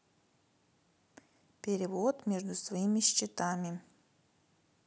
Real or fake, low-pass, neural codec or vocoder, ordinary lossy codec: real; none; none; none